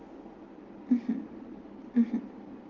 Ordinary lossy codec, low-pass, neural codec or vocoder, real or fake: Opus, 16 kbps; 7.2 kHz; none; real